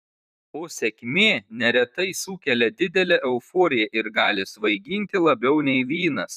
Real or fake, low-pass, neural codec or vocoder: fake; 14.4 kHz; vocoder, 44.1 kHz, 128 mel bands, Pupu-Vocoder